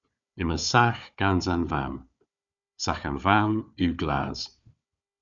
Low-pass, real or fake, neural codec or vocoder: 7.2 kHz; fake; codec, 16 kHz, 4 kbps, FunCodec, trained on Chinese and English, 50 frames a second